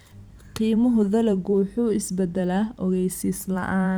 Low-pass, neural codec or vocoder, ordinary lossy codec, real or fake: none; vocoder, 44.1 kHz, 128 mel bands every 512 samples, BigVGAN v2; none; fake